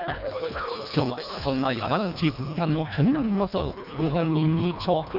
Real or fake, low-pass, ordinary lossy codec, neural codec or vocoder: fake; 5.4 kHz; none; codec, 24 kHz, 1.5 kbps, HILCodec